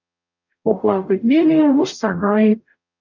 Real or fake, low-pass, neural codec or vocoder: fake; 7.2 kHz; codec, 44.1 kHz, 0.9 kbps, DAC